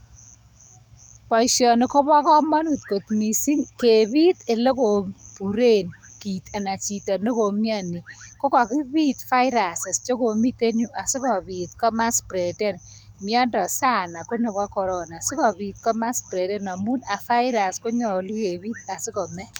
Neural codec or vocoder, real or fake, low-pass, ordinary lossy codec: codec, 44.1 kHz, 7.8 kbps, DAC; fake; none; none